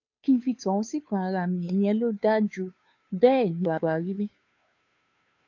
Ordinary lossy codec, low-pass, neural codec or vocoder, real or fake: AAC, 48 kbps; 7.2 kHz; codec, 16 kHz, 2 kbps, FunCodec, trained on Chinese and English, 25 frames a second; fake